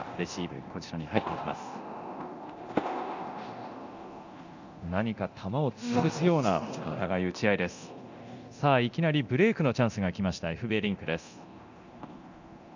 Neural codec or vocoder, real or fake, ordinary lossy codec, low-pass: codec, 24 kHz, 0.9 kbps, DualCodec; fake; none; 7.2 kHz